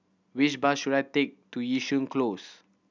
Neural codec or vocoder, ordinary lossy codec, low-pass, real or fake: none; none; 7.2 kHz; real